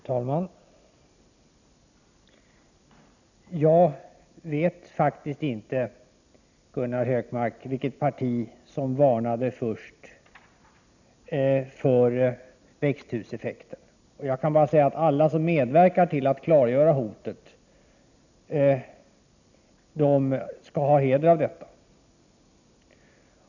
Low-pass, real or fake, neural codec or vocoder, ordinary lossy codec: 7.2 kHz; real; none; none